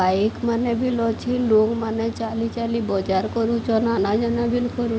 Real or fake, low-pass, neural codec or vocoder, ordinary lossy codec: real; none; none; none